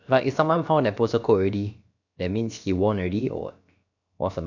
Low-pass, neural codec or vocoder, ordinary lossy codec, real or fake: 7.2 kHz; codec, 16 kHz, about 1 kbps, DyCAST, with the encoder's durations; none; fake